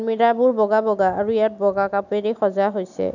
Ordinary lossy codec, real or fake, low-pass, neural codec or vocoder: none; real; 7.2 kHz; none